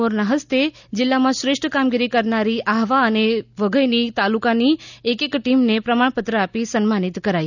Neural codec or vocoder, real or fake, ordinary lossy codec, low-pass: none; real; none; 7.2 kHz